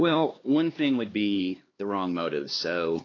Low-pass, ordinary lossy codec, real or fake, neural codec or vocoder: 7.2 kHz; AAC, 32 kbps; fake; codec, 16 kHz, 2 kbps, X-Codec, HuBERT features, trained on LibriSpeech